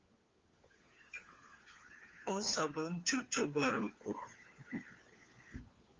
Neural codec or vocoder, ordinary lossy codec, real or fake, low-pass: codec, 16 kHz, 4 kbps, FunCodec, trained on LibriTTS, 50 frames a second; Opus, 32 kbps; fake; 7.2 kHz